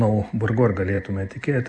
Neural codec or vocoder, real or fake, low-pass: none; real; 9.9 kHz